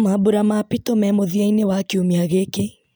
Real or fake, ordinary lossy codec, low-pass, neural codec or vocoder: real; none; none; none